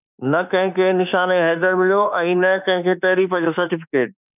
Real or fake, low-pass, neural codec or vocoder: fake; 3.6 kHz; autoencoder, 48 kHz, 32 numbers a frame, DAC-VAE, trained on Japanese speech